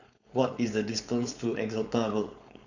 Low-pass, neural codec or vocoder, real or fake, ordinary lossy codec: 7.2 kHz; codec, 16 kHz, 4.8 kbps, FACodec; fake; none